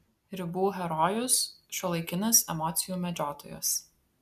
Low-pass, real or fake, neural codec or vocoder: 14.4 kHz; real; none